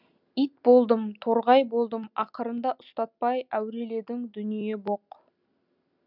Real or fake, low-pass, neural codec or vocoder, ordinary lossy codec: real; 5.4 kHz; none; none